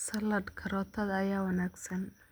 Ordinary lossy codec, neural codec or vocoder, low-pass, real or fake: none; none; none; real